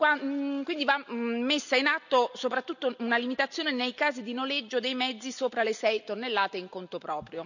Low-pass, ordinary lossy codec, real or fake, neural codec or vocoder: 7.2 kHz; none; real; none